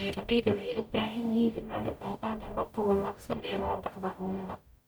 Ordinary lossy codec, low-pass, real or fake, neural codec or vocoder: none; none; fake; codec, 44.1 kHz, 0.9 kbps, DAC